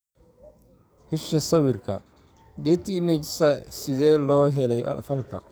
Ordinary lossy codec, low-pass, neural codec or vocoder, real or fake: none; none; codec, 44.1 kHz, 2.6 kbps, SNAC; fake